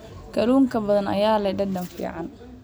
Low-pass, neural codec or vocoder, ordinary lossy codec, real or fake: none; none; none; real